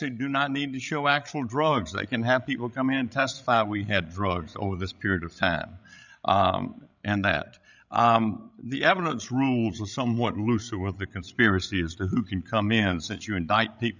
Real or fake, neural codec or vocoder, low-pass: fake; codec, 16 kHz, 16 kbps, FreqCodec, larger model; 7.2 kHz